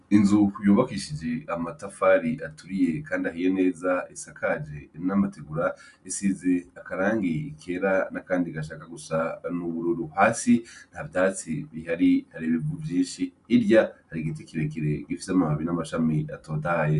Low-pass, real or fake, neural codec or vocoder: 10.8 kHz; real; none